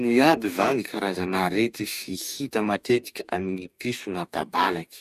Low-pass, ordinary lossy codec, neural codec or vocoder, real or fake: 14.4 kHz; none; codec, 44.1 kHz, 2.6 kbps, DAC; fake